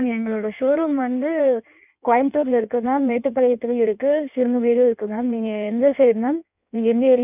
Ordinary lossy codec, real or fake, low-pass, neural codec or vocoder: none; fake; 3.6 kHz; codec, 16 kHz in and 24 kHz out, 1.1 kbps, FireRedTTS-2 codec